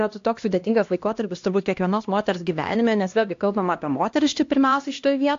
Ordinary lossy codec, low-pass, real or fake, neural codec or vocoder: AAC, 48 kbps; 7.2 kHz; fake; codec, 16 kHz, 1 kbps, X-Codec, HuBERT features, trained on LibriSpeech